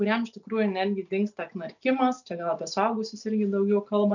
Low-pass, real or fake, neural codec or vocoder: 7.2 kHz; real; none